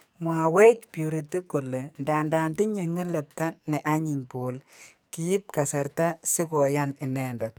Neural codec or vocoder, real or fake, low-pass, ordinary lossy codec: codec, 44.1 kHz, 2.6 kbps, SNAC; fake; none; none